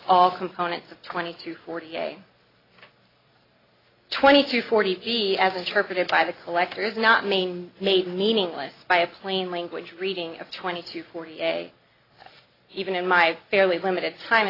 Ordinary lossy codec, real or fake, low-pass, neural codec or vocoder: AAC, 24 kbps; real; 5.4 kHz; none